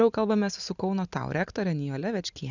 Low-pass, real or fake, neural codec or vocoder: 7.2 kHz; real; none